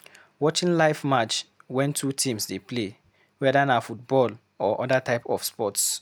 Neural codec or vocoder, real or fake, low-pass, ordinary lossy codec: none; real; none; none